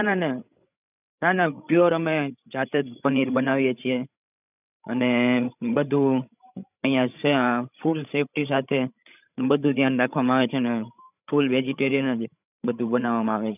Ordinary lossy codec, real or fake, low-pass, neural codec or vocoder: none; fake; 3.6 kHz; codec, 16 kHz, 16 kbps, FreqCodec, larger model